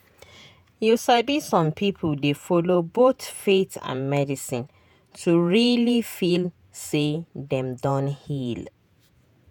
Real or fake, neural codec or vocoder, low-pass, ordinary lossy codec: fake; vocoder, 48 kHz, 128 mel bands, Vocos; none; none